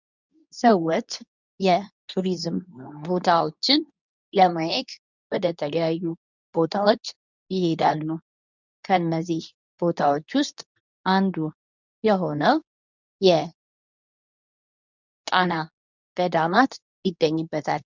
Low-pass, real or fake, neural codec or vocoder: 7.2 kHz; fake; codec, 24 kHz, 0.9 kbps, WavTokenizer, medium speech release version 2